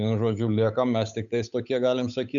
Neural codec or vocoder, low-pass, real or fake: codec, 16 kHz, 8 kbps, FunCodec, trained on Chinese and English, 25 frames a second; 7.2 kHz; fake